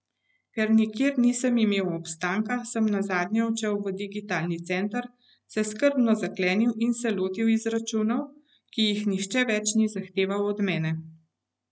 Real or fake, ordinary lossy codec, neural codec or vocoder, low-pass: real; none; none; none